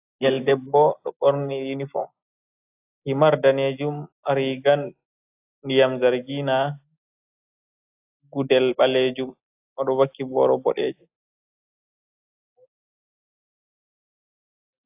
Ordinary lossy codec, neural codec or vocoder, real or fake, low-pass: AAC, 32 kbps; none; real; 3.6 kHz